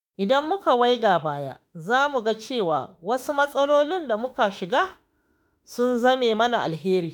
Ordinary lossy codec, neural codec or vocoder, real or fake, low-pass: none; autoencoder, 48 kHz, 32 numbers a frame, DAC-VAE, trained on Japanese speech; fake; 19.8 kHz